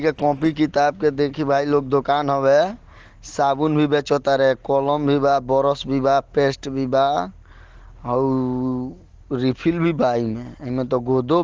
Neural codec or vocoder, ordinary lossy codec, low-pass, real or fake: none; Opus, 16 kbps; 7.2 kHz; real